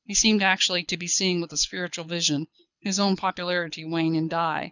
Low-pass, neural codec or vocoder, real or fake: 7.2 kHz; codec, 24 kHz, 6 kbps, HILCodec; fake